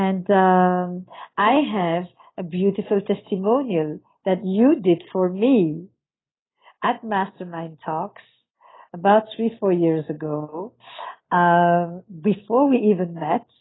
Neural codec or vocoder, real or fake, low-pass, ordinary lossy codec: none; real; 7.2 kHz; AAC, 16 kbps